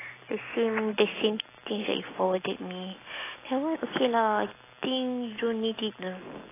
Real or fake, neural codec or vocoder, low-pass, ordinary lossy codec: real; none; 3.6 kHz; AAC, 16 kbps